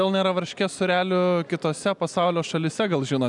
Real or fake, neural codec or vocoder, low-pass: real; none; 10.8 kHz